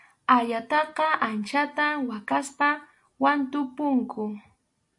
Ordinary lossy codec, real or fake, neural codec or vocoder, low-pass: AAC, 64 kbps; real; none; 10.8 kHz